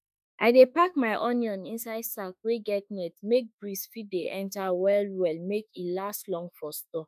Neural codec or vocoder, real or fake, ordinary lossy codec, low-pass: autoencoder, 48 kHz, 32 numbers a frame, DAC-VAE, trained on Japanese speech; fake; none; 14.4 kHz